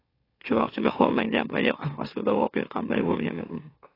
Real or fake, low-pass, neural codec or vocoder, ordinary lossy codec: fake; 5.4 kHz; autoencoder, 44.1 kHz, a latent of 192 numbers a frame, MeloTTS; AAC, 24 kbps